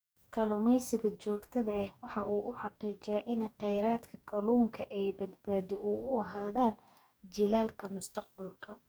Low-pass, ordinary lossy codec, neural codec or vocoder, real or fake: none; none; codec, 44.1 kHz, 2.6 kbps, DAC; fake